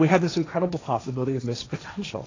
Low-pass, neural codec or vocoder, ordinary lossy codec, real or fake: 7.2 kHz; codec, 16 kHz, 1.1 kbps, Voila-Tokenizer; AAC, 32 kbps; fake